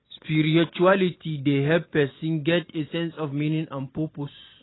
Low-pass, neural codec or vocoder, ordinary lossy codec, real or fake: 7.2 kHz; none; AAC, 16 kbps; real